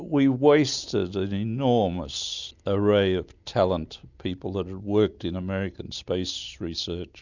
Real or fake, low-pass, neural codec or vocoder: real; 7.2 kHz; none